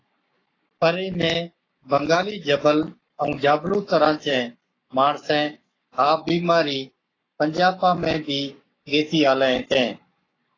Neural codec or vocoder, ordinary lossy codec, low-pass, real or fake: codec, 44.1 kHz, 7.8 kbps, Pupu-Codec; AAC, 32 kbps; 7.2 kHz; fake